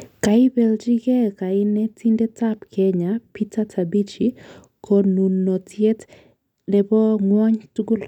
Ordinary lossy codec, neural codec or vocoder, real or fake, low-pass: none; none; real; 19.8 kHz